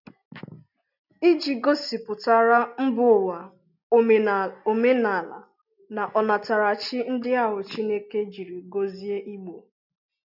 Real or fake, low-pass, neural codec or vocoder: real; 5.4 kHz; none